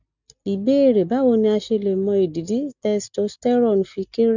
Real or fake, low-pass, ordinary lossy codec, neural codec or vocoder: real; 7.2 kHz; none; none